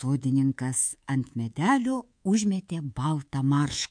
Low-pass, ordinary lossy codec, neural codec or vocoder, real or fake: 9.9 kHz; MP3, 64 kbps; codec, 24 kHz, 3.1 kbps, DualCodec; fake